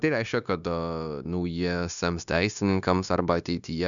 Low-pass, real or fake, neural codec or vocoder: 7.2 kHz; fake; codec, 16 kHz, 0.9 kbps, LongCat-Audio-Codec